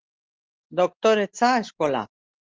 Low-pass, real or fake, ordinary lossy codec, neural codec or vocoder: 7.2 kHz; real; Opus, 16 kbps; none